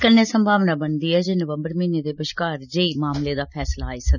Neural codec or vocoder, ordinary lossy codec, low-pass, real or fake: none; none; 7.2 kHz; real